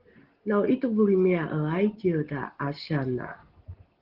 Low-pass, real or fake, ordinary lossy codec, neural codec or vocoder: 5.4 kHz; real; Opus, 16 kbps; none